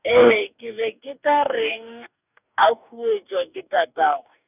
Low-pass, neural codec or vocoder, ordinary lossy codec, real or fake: 3.6 kHz; codec, 44.1 kHz, 2.6 kbps, DAC; none; fake